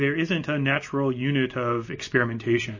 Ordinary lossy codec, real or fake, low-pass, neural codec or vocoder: MP3, 32 kbps; real; 7.2 kHz; none